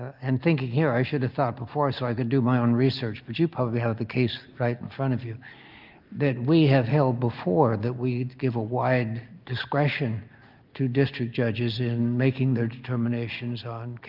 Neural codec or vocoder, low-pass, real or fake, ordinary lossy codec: none; 5.4 kHz; real; Opus, 24 kbps